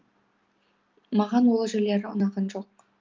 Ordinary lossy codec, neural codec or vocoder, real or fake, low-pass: Opus, 24 kbps; none; real; 7.2 kHz